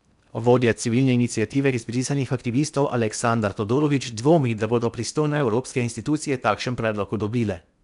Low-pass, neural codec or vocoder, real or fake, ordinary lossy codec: 10.8 kHz; codec, 16 kHz in and 24 kHz out, 0.8 kbps, FocalCodec, streaming, 65536 codes; fake; none